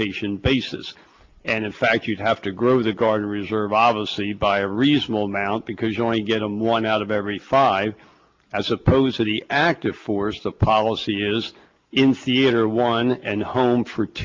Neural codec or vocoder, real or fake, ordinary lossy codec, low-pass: none; real; Opus, 32 kbps; 7.2 kHz